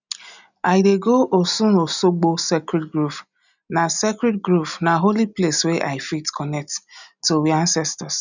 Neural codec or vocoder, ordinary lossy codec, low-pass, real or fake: none; none; 7.2 kHz; real